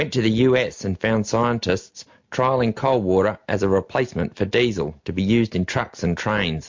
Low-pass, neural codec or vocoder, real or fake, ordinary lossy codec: 7.2 kHz; none; real; MP3, 48 kbps